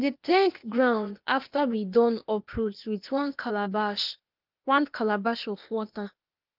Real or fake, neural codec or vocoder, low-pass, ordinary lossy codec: fake; codec, 16 kHz, 0.8 kbps, ZipCodec; 5.4 kHz; Opus, 24 kbps